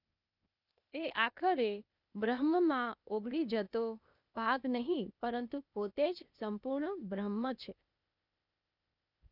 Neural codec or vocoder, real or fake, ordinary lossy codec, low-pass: codec, 16 kHz, 0.8 kbps, ZipCodec; fake; none; 5.4 kHz